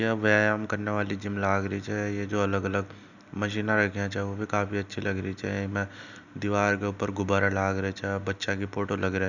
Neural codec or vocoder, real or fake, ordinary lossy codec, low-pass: none; real; none; 7.2 kHz